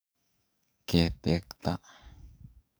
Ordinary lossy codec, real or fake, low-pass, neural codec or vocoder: none; fake; none; codec, 44.1 kHz, 7.8 kbps, DAC